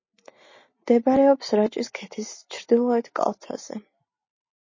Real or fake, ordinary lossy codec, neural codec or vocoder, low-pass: real; MP3, 32 kbps; none; 7.2 kHz